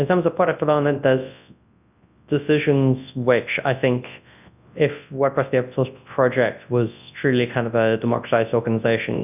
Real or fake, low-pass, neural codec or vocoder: fake; 3.6 kHz; codec, 24 kHz, 0.9 kbps, WavTokenizer, large speech release